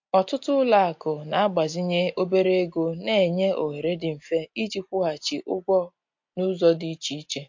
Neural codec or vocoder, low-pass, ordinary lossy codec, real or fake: none; 7.2 kHz; MP3, 48 kbps; real